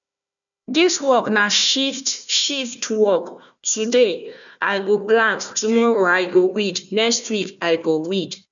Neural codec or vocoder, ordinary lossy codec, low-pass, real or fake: codec, 16 kHz, 1 kbps, FunCodec, trained on Chinese and English, 50 frames a second; none; 7.2 kHz; fake